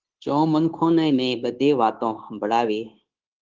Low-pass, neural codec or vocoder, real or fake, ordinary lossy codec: 7.2 kHz; codec, 16 kHz, 0.9 kbps, LongCat-Audio-Codec; fake; Opus, 16 kbps